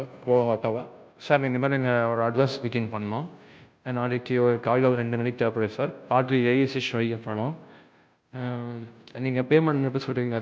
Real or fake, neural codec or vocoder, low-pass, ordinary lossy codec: fake; codec, 16 kHz, 0.5 kbps, FunCodec, trained on Chinese and English, 25 frames a second; none; none